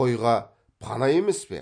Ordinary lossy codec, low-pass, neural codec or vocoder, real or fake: MP3, 48 kbps; 9.9 kHz; none; real